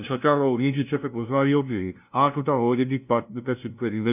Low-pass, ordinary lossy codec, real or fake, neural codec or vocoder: 3.6 kHz; none; fake; codec, 16 kHz, 0.5 kbps, FunCodec, trained on LibriTTS, 25 frames a second